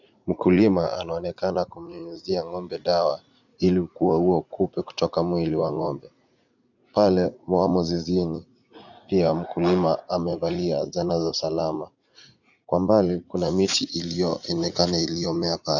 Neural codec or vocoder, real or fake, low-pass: vocoder, 44.1 kHz, 80 mel bands, Vocos; fake; 7.2 kHz